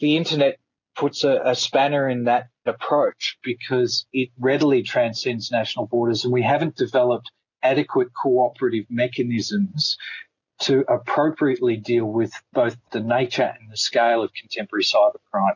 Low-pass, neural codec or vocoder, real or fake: 7.2 kHz; none; real